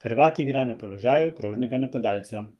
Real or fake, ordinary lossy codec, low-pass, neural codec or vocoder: fake; Opus, 32 kbps; 14.4 kHz; codec, 32 kHz, 1.9 kbps, SNAC